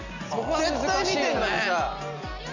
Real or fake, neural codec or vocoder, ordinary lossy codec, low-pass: real; none; none; 7.2 kHz